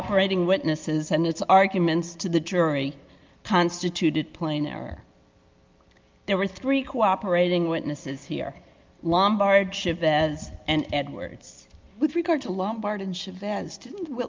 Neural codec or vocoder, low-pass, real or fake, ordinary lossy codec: none; 7.2 kHz; real; Opus, 24 kbps